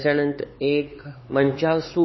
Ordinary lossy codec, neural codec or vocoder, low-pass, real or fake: MP3, 24 kbps; codec, 16 kHz, 4 kbps, X-Codec, HuBERT features, trained on LibriSpeech; 7.2 kHz; fake